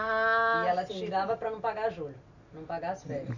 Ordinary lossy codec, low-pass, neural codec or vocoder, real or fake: none; 7.2 kHz; none; real